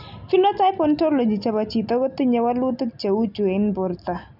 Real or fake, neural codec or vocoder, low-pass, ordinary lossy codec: real; none; 5.4 kHz; none